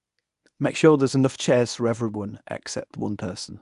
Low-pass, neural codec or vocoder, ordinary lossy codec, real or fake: 10.8 kHz; codec, 24 kHz, 0.9 kbps, WavTokenizer, medium speech release version 1; none; fake